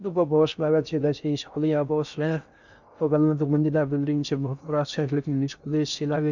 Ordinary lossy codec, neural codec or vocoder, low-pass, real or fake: none; codec, 16 kHz in and 24 kHz out, 0.6 kbps, FocalCodec, streaming, 4096 codes; 7.2 kHz; fake